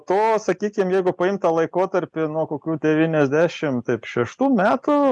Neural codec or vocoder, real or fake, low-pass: none; real; 10.8 kHz